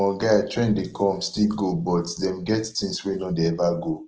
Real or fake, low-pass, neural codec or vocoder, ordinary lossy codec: real; 7.2 kHz; none; Opus, 16 kbps